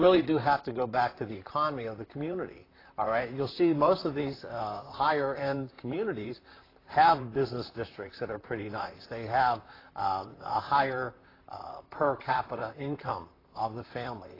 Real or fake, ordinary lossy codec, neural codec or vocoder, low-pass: fake; AAC, 24 kbps; vocoder, 44.1 kHz, 128 mel bands, Pupu-Vocoder; 5.4 kHz